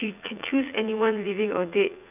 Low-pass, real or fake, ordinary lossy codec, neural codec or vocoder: 3.6 kHz; fake; none; vocoder, 44.1 kHz, 80 mel bands, Vocos